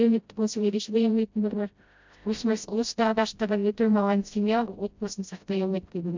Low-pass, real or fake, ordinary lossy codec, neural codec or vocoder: 7.2 kHz; fake; MP3, 64 kbps; codec, 16 kHz, 0.5 kbps, FreqCodec, smaller model